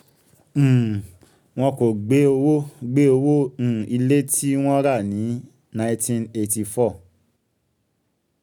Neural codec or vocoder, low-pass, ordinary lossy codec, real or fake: none; 19.8 kHz; none; real